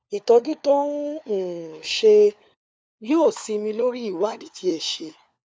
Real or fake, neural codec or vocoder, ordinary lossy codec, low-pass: fake; codec, 16 kHz, 4 kbps, FunCodec, trained on LibriTTS, 50 frames a second; none; none